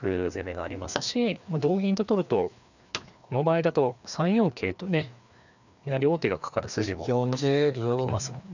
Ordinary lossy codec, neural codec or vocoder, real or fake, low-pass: none; codec, 16 kHz, 2 kbps, FreqCodec, larger model; fake; 7.2 kHz